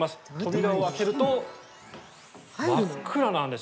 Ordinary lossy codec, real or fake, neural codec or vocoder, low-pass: none; real; none; none